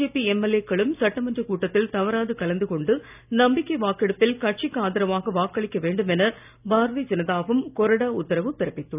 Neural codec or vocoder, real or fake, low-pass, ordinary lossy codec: none; real; 3.6 kHz; none